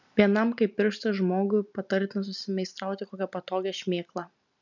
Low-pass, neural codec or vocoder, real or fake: 7.2 kHz; none; real